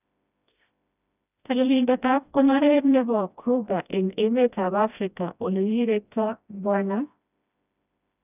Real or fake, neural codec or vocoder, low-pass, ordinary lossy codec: fake; codec, 16 kHz, 1 kbps, FreqCodec, smaller model; 3.6 kHz; none